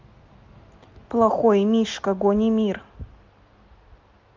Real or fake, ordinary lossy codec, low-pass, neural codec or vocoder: real; Opus, 32 kbps; 7.2 kHz; none